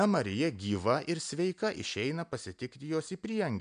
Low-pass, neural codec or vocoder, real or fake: 9.9 kHz; none; real